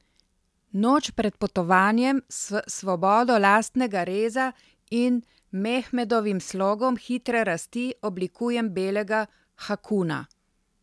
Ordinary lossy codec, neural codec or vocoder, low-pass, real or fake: none; none; none; real